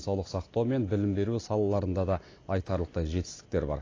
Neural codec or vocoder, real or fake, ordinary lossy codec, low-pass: none; real; AAC, 32 kbps; 7.2 kHz